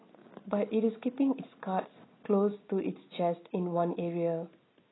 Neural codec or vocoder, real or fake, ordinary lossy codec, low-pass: none; real; AAC, 16 kbps; 7.2 kHz